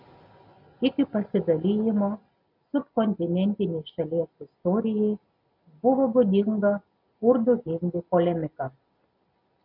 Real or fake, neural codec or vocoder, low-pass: real; none; 5.4 kHz